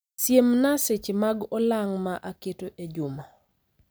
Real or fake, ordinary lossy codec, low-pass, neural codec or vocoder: real; none; none; none